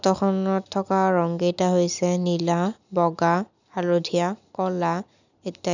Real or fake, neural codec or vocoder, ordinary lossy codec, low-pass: real; none; none; 7.2 kHz